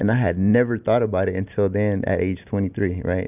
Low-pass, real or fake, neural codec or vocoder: 3.6 kHz; real; none